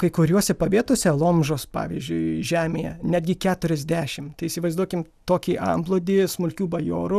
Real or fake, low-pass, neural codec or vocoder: real; 14.4 kHz; none